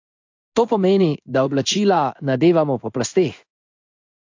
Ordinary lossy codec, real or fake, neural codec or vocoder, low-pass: AAC, 48 kbps; fake; codec, 16 kHz in and 24 kHz out, 1 kbps, XY-Tokenizer; 7.2 kHz